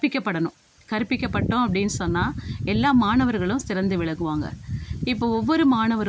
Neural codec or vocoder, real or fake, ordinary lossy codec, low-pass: none; real; none; none